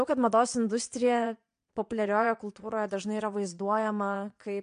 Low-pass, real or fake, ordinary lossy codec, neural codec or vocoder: 9.9 kHz; fake; MP3, 64 kbps; vocoder, 22.05 kHz, 80 mel bands, Vocos